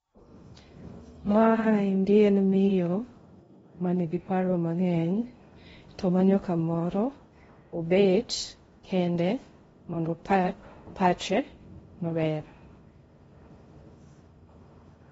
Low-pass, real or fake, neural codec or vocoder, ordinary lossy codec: 10.8 kHz; fake; codec, 16 kHz in and 24 kHz out, 0.6 kbps, FocalCodec, streaming, 2048 codes; AAC, 24 kbps